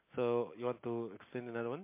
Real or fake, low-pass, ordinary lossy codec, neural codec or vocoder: real; 3.6 kHz; MP3, 24 kbps; none